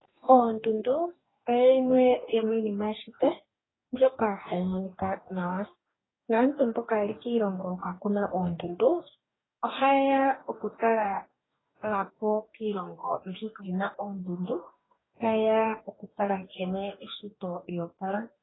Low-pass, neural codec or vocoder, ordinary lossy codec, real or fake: 7.2 kHz; codec, 44.1 kHz, 2.6 kbps, DAC; AAC, 16 kbps; fake